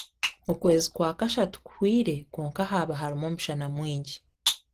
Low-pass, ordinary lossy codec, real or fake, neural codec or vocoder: 14.4 kHz; Opus, 16 kbps; real; none